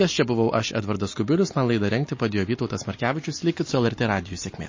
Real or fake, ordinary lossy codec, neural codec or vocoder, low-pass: real; MP3, 32 kbps; none; 7.2 kHz